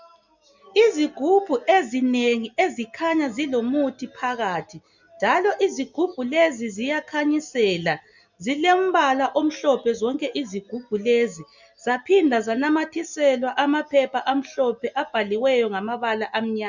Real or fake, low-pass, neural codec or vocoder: real; 7.2 kHz; none